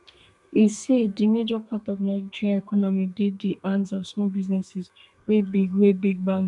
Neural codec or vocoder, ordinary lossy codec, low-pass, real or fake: codec, 32 kHz, 1.9 kbps, SNAC; none; 10.8 kHz; fake